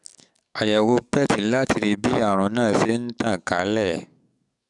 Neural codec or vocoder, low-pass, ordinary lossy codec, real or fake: codec, 44.1 kHz, 7.8 kbps, DAC; 10.8 kHz; none; fake